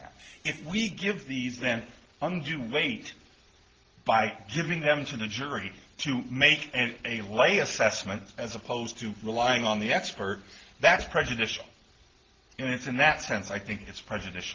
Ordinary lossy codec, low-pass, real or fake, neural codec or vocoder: Opus, 16 kbps; 7.2 kHz; real; none